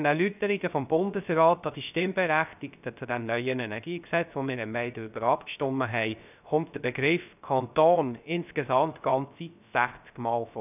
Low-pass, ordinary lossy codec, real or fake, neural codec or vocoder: 3.6 kHz; none; fake; codec, 16 kHz, 0.3 kbps, FocalCodec